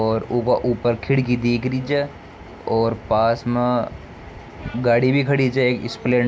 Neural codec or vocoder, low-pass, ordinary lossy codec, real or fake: none; none; none; real